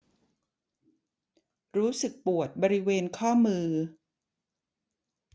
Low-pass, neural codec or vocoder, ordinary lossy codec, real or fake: none; none; none; real